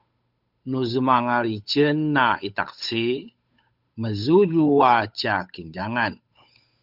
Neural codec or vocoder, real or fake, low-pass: codec, 16 kHz, 8 kbps, FunCodec, trained on Chinese and English, 25 frames a second; fake; 5.4 kHz